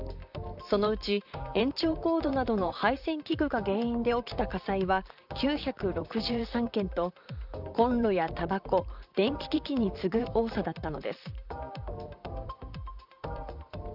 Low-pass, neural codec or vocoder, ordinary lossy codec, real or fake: 5.4 kHz; vocoder, 44.1 kHz, 128 mel bands, Pupu-Vocoder; none; fake